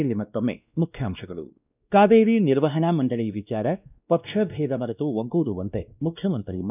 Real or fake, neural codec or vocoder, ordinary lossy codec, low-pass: fake; codec, 16 kHz, 1 kbps, X-Codec, WavLM features, trained on Multilingual LibriSpeech; none; 3.6 kHz